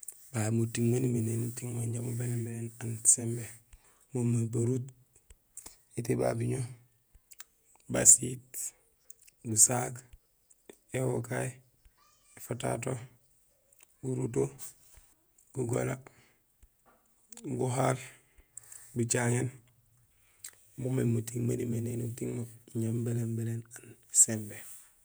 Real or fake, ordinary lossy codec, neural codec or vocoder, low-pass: fake; none; vocoder, 48 kHz, 128 mel bands, Vocos; none